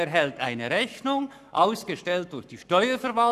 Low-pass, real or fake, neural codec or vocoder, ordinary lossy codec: 14.4 kHz; fake; codec, 44.1 kHz, 7.8 kbps, Pupu-Codec; none